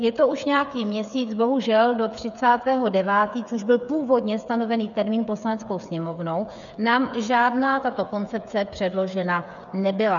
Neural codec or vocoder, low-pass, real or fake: codec, 16 kHz, 8 kbps, FreqCodec, smaller model; 7.2 kHz; fake